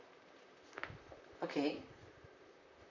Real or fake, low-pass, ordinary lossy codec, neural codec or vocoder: fake; 7.2 kHz; none; vocoder, 44.1 kHz, 128 mel bands, Pupu-Vocoder